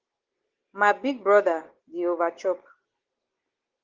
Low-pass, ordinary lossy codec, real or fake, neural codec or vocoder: 7.2 kHz; Opus, 16 kbps; real; none